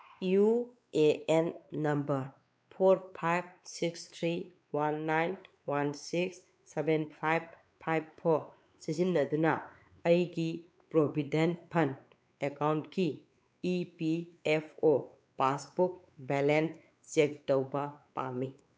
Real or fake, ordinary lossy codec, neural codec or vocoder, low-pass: fake; none; codec, 16 kHz, 4 kbps, X-Codec, WavLM features, trained on Multilingual LibriSpeech; none